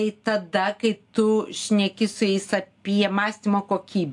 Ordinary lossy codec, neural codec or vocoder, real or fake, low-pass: AAC, 64 kbps; none; real; 10.8 kHz